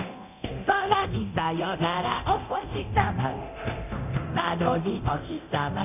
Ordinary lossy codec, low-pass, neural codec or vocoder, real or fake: none; 3.6 kHz; codec, 24 kHz, 0.9 kbps, DualCodec; fake